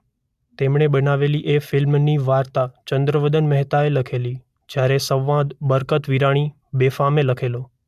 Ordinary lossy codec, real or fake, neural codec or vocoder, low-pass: MP3, 96 kbps; real; none; 14.4 kHz